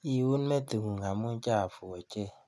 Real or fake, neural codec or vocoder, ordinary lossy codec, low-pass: real; none; none; none